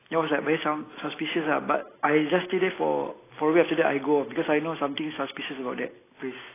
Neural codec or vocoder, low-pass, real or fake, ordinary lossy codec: none; 3.6 kHz; real; AAC, 16 kbps